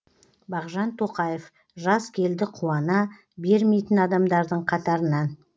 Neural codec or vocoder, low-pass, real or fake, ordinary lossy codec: none; none; real; none